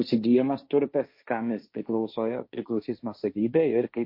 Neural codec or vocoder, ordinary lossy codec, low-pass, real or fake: codec, 16 kHz, 1.1 kbps, Voila-Tokenizer; MP3, 32 kbps; 5.4 kHz; fake